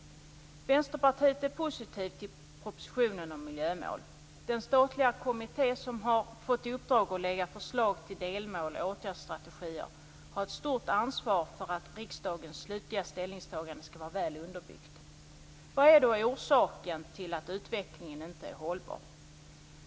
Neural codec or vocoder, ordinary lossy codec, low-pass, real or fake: none; none; none; real